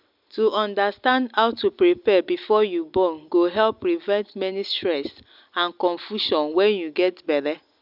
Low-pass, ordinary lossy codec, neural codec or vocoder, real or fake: 5.4 kHz; none; none; real